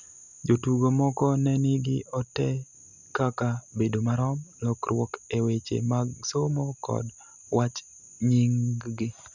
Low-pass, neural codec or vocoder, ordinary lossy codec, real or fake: 7.2 kHz; none; none; real